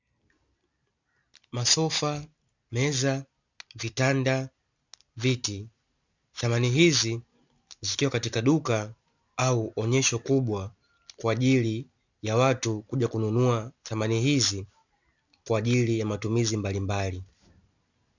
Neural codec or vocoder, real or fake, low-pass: none; real; 7.2 kHz